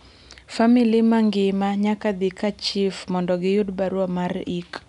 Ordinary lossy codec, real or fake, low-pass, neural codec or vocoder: none; real; 10.8 kHz; none